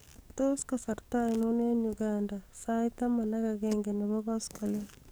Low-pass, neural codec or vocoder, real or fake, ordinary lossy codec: none; codec, 44.1 kHz, 7.8 kbps, DAC; fake; none